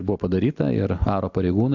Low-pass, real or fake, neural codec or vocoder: 7.2 kHz; real; none